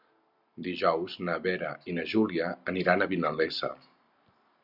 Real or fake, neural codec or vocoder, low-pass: real; none; 5.4 kHz